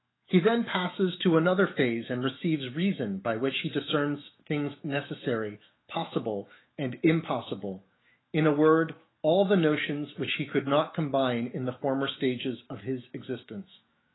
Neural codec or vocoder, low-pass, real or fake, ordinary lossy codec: autoencoder, 48 kHz, 128 numbers a frame, DAC-VAE, trained on Japanese speech; 7.2 kHz; fake; AAC, 16 kbps